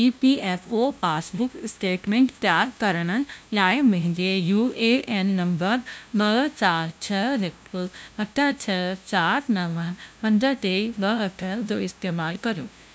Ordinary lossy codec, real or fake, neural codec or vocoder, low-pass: none; fake; codec, 16 kHz, 0.5 kbps, FunCodec, trained on LibriTTS, 25 frames a second; none